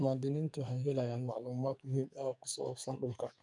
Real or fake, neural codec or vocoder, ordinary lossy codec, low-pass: fake; codec, 44.1 kHz, 2.6 kbps, SNAC; none; 10.8 kHz